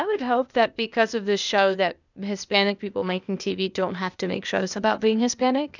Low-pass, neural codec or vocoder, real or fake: 7.2 kHz; codec, 16 kHz, 0.8 kbps, ZipCodec; fake